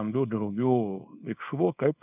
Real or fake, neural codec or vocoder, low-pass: fake; codec, 24 kHz, 0.9 kbps, WavTokenizer, small release; 3.6 kHz